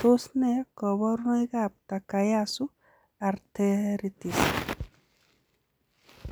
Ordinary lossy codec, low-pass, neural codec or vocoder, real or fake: none; none; none; real